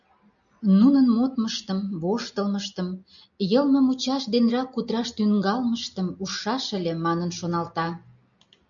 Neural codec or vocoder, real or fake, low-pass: none; real; 7.2 kHz